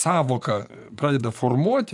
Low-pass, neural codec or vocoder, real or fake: 10.8 kHz; vocoder, 24 kHz, 100 mel bands, Vocos; fake